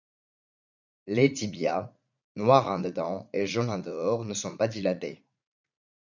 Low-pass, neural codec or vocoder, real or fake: 7.2 kHz; vocoder, 44.1 kHz, 80 mel bands, Vocos; fake